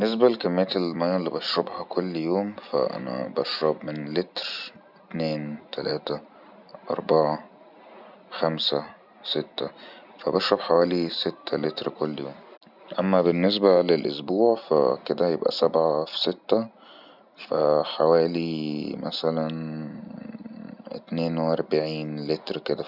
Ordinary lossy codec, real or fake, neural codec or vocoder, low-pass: none; real; none; 5.4 kHz